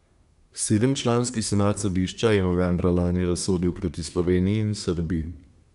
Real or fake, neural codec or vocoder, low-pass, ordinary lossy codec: fake; codec, 24 kHz, 1 kbps, SNAC; 10.8 kHz; none